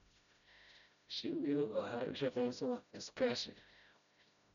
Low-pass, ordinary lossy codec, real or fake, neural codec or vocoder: 7.2 kHz; none; fake; codec, 16 kHz, 0.5 kbps, FreqCodec, smaller model